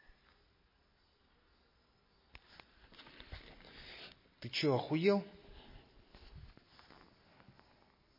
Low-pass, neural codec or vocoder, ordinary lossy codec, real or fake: 5.4 kHz; none; MP3, 24 kbps; real